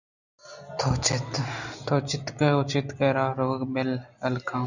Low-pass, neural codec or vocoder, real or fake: 7.2 kHz; none; real